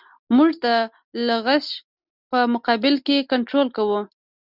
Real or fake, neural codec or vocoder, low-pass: real; none; 5.4 kHz